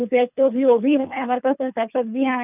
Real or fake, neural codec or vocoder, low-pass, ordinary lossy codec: fake; codec, 24 kHz, 3 kbps, HILCodec; 3.6 kHz; none